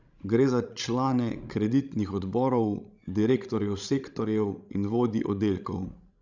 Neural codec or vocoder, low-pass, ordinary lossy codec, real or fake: codec, 16 kHz, 16 kbps, FreqCodec, larger model; 7.2 kHz; Opus, 64 kbps; fake